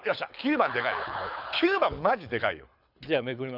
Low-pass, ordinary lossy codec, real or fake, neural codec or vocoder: 5.4 kHz; none; fake; codec, 24 kHz, 6 kbps, HILCodec